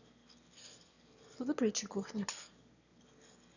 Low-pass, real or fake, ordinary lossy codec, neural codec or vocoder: 7.2 kHz; fake; none; autoencoder, 22.05 kHz, a latent of 192 numbers a frame, VITS, trained on one speaker